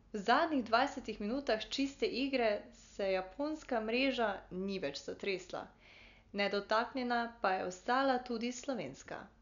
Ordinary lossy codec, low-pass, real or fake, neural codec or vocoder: none; 7.2 kHz; real; none